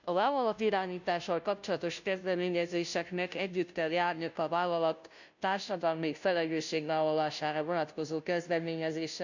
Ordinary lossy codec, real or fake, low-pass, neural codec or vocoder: none; fake; 7.2 kHz; codec, 16 kHz, 0.5 kbps, FunCodec, trained on Chinese and English, 25 frames a second